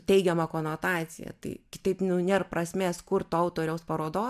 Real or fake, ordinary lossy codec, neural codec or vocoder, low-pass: real; AAC, 96 kbps; none; 14.4 kHz